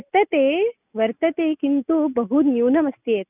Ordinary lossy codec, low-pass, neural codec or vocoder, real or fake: none; 3.6 kHz; none; real